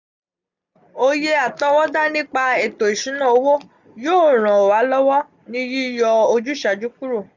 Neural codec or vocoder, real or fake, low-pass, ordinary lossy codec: none; real; 7.2 kHz; none